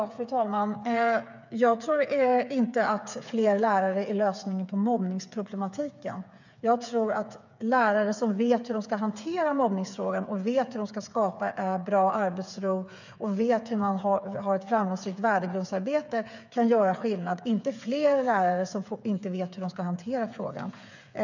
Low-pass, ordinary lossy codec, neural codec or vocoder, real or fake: 7.2 kHz; none; codec, 16 kHz, 8 kbps, FreqCodec, smaller model; fake